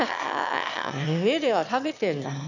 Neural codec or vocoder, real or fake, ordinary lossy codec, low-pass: autoencoder, 22.05 kHz, a latent of 192 numbers a frame, VITS, trained on one speaker; fake; none; 7.2 kHz